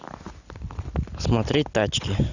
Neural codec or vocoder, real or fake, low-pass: none; real; 7.2 kHz